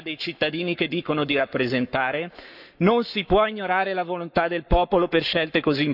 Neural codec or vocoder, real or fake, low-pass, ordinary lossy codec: codec, 44.1 kHz, 7.8 kbps, Pupu-Codec; fake; 5.4 kHz; none